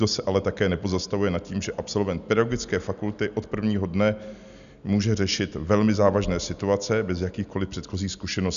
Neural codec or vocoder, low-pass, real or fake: none; 7.2 kHz; real